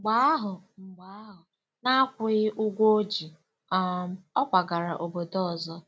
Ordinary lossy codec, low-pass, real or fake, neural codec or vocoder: none; none; real; none